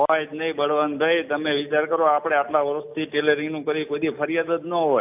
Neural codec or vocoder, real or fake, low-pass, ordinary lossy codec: none; real; 3.6 kHz; AAC, 32 kbps